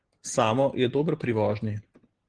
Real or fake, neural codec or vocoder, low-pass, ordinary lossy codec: real; none; 9.9 kHz; Opus, 16 kbps